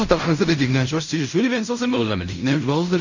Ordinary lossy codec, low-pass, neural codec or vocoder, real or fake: none; 7.2 kHz; codec, 16 kHz in and 24 kHz out, 0.4 kbps, LongCat-Audio-Codec, fine tuned four codebook decoder; fake